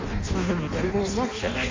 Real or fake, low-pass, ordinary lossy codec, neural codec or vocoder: fake; 7.2 kHz; MP3, 48 kbps; codec, 16 kHz in and 24 kHz out, 0.6 kbps, FireRedTTS-2 codec